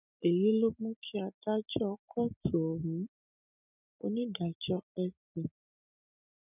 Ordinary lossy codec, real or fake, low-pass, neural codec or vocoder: none; real; 3.6 kHz; none